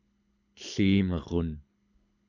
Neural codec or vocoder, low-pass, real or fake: codec, 24 kHz, 6 kbps, HILCodec; 7.2 kHz; fake